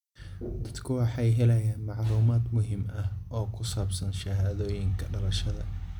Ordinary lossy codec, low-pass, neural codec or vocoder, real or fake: none; 19.8 kHz; none; real